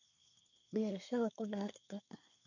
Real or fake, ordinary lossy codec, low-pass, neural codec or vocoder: fake; none; 7.2 kHz; codec, 24 kHz, 1 kbps, SNAC